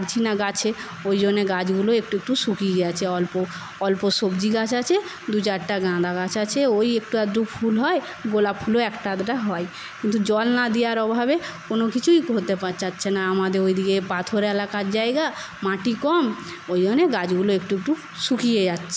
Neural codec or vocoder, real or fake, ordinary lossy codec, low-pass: none; real; none; none